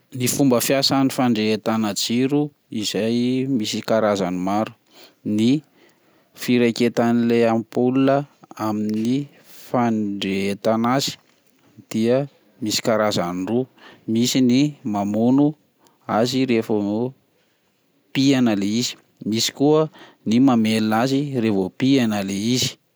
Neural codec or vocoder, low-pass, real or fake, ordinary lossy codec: none; none; real; none